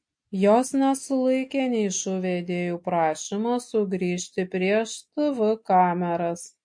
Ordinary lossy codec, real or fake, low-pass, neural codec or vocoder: MP3, 48 kbps; real; 9.9 kHz; none